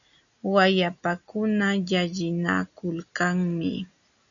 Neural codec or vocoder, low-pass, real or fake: none; 7.2 kHz; real